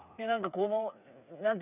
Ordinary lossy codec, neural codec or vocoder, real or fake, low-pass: none; codec, 16 kHz, 8 kbps, FreqCodec, smaller model; fake; 3.6 kHz